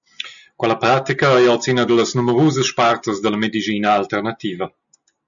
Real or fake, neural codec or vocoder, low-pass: real; none; 7.2 kHz